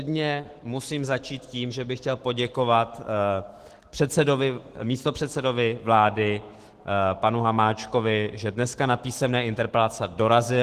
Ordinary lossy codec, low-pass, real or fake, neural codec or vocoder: Opus, 16 kbps; 14.4 kHz; fake; autoencoder, 48 kHz, 128 numbers a frame, DAC-VAE, trained on Japanese speech